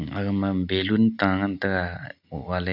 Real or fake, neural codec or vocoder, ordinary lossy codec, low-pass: real; none; none; 5.4 kHz